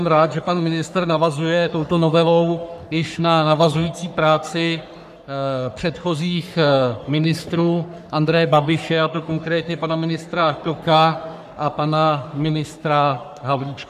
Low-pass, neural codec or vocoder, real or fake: 14.4 kHz; codec, 44.1 kHz, 3.4 kbps, Pupu-Codec; fake